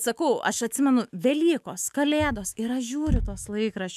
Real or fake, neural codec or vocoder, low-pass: fake; autoencoder, 48 kHz, 128 numbers a frame, DAC-VAE, trained on Japanese speech; 14.4 kHz